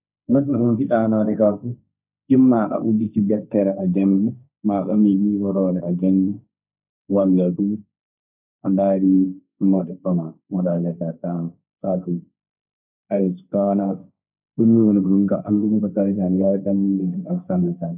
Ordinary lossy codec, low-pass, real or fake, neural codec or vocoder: none; 3.6 kHz; fake; codec, 16 kHz, 1.1 kbps, Voila-Tokenizer